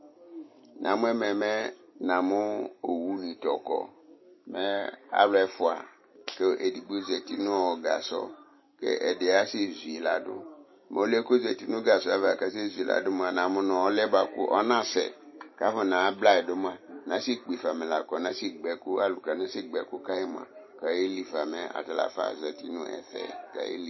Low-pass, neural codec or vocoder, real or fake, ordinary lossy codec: 7.2 kHz; none; real; MP3, 24 kbps